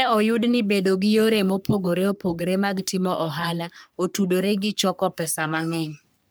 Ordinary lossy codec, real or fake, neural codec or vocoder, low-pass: none; fake; codec, 44.1 kHz, 3.4 kbps, Pupu-Codec; none